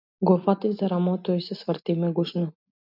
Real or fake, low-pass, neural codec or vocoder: real; 5.4 kHz; none